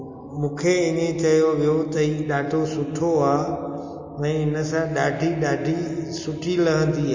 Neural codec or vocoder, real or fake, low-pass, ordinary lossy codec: none; real; 7.2 kHz; MP3, 32 kbps